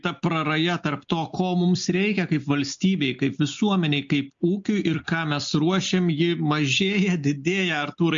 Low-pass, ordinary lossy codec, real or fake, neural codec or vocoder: 7.2 kHz; MP3, 48 kbps; real; none